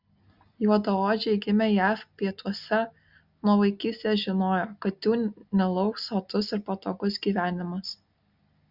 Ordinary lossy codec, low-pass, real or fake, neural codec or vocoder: Opus, 64 kbps; 5.4 kHz; real; none